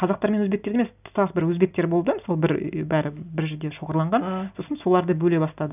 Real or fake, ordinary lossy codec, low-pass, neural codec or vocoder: real; none; 3.6 kHz; none